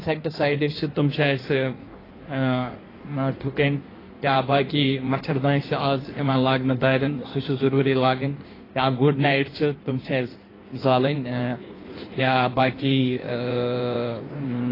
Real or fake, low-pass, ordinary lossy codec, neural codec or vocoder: fake; 5.4 kHz; AAC, 24 kbps; codec, 16 kHz in and 24 kHz out, 1.1 kbps, FireRedTTS-2 codec